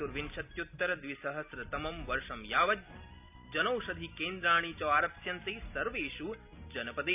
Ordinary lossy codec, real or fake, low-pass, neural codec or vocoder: none; real; 3.6 kHz; none